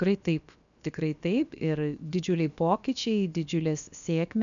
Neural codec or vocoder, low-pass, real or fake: codec, 16 kHz, about 1 kbps, DyCAST, with the encoder's durations; 7.2 kHz; fake